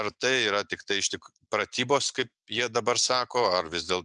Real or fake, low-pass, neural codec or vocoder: real; 10.8 kHz; none